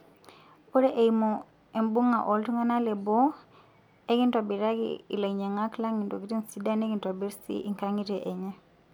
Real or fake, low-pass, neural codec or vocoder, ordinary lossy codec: real; 19.8 kHz; none; none